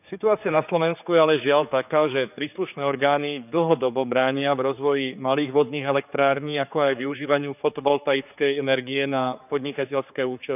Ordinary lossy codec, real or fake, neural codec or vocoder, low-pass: none; fake; codec, 16 kHz, 4 kbps, X-Codec, HuBERT features, trained on general audio; 3.6 kHz